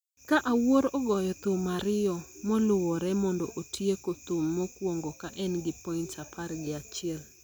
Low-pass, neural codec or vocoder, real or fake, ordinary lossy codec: none; none; real; none